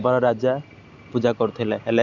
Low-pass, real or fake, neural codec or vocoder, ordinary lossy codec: 7.2 kHz; real; none; none